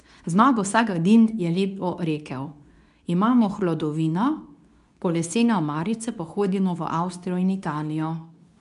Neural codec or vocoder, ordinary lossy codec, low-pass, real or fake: codec, 24 kHz, 0.9 kbps, WavTokenizer, medium speech release version 2; none; 10.8 kHz; fake